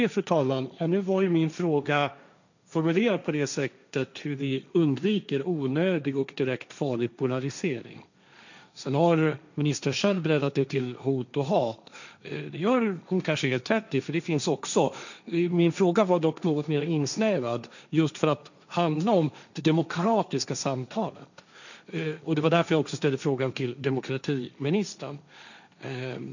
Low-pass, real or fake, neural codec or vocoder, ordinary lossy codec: 7.2 kHz; fake; codec, 16 kHz, 1.1 kbps, Voila-Tokenizer; none